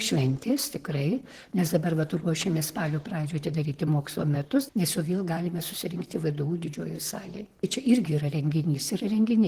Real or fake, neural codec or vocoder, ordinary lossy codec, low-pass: fake; vocoder, 44.1 kHz, 128 mel bands, Pupu-Vocoder; Opus, 16 kbps; 14.4 kHz